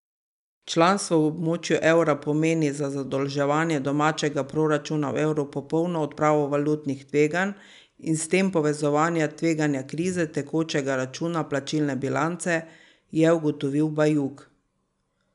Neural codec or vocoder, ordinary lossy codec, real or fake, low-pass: none; none; real; 10.8 kHz